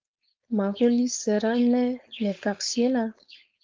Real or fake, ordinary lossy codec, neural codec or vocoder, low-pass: fake; Opus, 24 kbps; codec, 16 kHz, 4.8 kbps, FACodec; 7.2 kHz